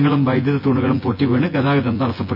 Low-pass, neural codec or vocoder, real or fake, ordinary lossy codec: 5.4 kHz; vocoder, 24 kHz, 100 mel bands, Vocos; fake; none